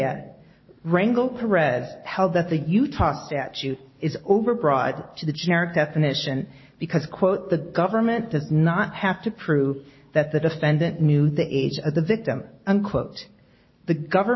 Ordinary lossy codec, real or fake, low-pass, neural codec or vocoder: MP3, 24 kbps; real; 7.2 kHz; none